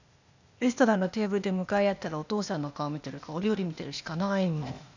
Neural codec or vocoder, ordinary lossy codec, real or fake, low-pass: codec, 16 kHz, 0.8 kbps, ZipCodec; none; fake; 7.2 kHz